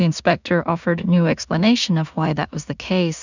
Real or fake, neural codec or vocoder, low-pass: fake; codec, 16 kHz in and 24 kHz out, 0.4 kbps, LongCat-Audio-Codec, two codebook decoder; 7.2 kHz